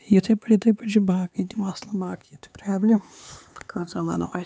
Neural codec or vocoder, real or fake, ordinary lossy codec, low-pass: codec, 16 kHz, 4 kbps, X-Codec, HuBERT features, trained on LibriSpeech; fake; none; none